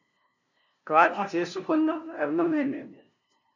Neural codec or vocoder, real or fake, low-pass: codec, 16 kHz, 0.5 kbps, FunCodec, trained on LibriTTS, 25 frames a second; fake; 7.2 kHz